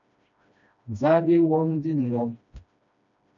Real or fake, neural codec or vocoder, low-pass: fake; codec, 16 kHz, 1 kbps, FreqCodec, smaller model; 7.2 kHz